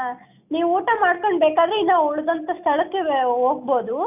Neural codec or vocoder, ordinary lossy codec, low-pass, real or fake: none; none; 3.6 kHz; real